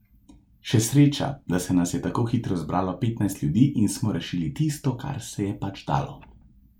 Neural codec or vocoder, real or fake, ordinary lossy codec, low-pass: none; real; MP3, 96 kbps; 19.8 kHz